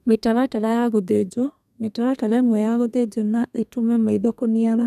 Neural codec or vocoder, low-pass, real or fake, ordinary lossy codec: codec, 32 kHz, 1.9 kbps, SNAC; 14.4 kHz; fake; none